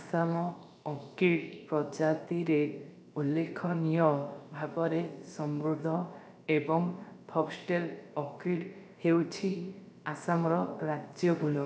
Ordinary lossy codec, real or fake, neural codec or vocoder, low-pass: none; fake; codec, 16 kHz, about 1 kbps, DyCAST, with the encoder's durations; none